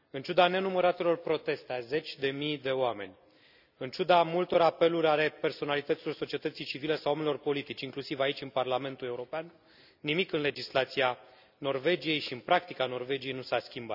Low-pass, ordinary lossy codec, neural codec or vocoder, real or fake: 5.4 kHz; none; none; real